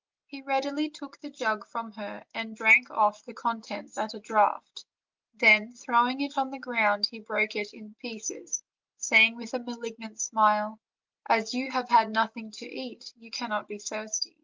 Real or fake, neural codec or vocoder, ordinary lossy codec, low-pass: real; none; Opus, 24 kbps; 7.2 kHz